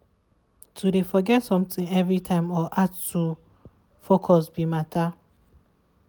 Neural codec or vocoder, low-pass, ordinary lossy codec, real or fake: none; none; none; real